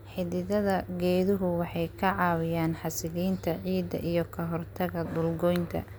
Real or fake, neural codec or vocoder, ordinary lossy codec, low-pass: real; none; none; none